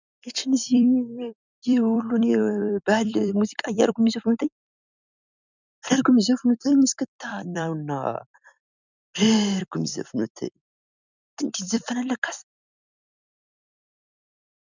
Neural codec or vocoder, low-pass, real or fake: vocoder, 44.1 kHz, 128 mel bands every 256 samples, BigVGAN v2; 7.2 kHz; fake